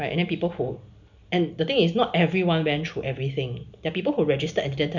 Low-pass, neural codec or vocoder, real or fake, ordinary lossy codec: 7.2 kHz; none; real; none